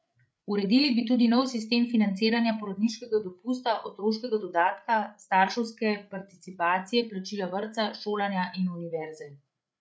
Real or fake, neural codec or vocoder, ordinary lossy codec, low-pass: fake; codec, 16 kHz, 8 kbps, FreqCodec, larger model; none; none